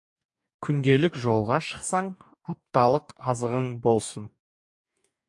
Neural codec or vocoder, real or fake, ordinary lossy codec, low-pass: codec, 44.1 kHz, 2.6 kbps, DAC; fake; AAC, 64 kbps; 10.8 kHz